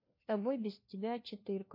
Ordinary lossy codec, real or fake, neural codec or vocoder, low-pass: MP3, 32 kbps; fake; codec, 16 kHz, 1 kbps, FunCodec, trained on LibriTTS, 50 frames a second; 5.4 kHz